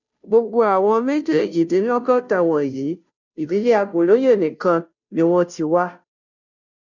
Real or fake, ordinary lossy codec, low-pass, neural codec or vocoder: fake; none; 7.2 kHz; codec, 16 kHz, 0.5 kbps, FunCodec, trained on Chinese and English, 25 frames a second